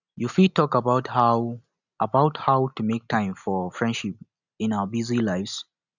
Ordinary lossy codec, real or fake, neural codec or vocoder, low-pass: none; real; none; 7.2 kHz